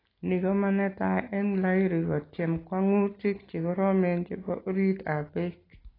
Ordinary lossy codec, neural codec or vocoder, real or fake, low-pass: AAC, 24 kbps; none; real; 5.4 kHz